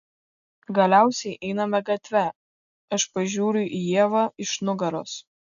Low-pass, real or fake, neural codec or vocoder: 7.2 kHz; real; none